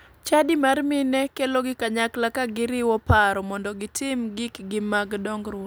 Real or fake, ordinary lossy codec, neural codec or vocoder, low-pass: real; none; none; none